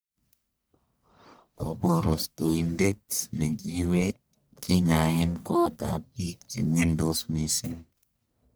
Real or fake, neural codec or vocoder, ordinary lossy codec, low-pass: fake; codec, 44.1 kHz, 1.7 kbps, Pupu-Codec; none; none